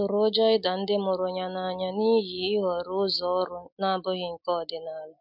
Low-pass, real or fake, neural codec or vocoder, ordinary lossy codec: 5.4 kHz; real; none; MP3, 48 kbps